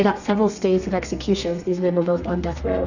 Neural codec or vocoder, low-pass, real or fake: codec, 32 kHz, 1.9 kbps, SNAC; 7.2 kHz; fake